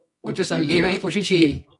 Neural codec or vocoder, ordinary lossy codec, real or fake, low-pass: codec, 24 kHz, 0.9 kbps, WavTokenizer, medium music audio release; MP3, 64 kbps; fake; 10.8 kHz